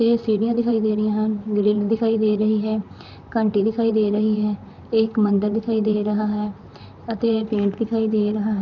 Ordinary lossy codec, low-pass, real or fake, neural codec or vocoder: none; 7.2 kHz; fake; vocoder, 44.1 kHz, 128 mel bands, Pupu-Vocoder